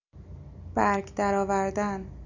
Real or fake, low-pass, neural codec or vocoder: real; 7.2 kHz; none